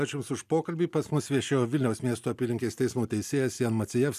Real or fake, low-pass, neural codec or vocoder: real; 14.4 kHz; none